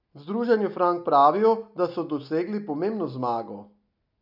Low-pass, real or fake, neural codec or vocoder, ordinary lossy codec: 5.4 kHz; real; none; none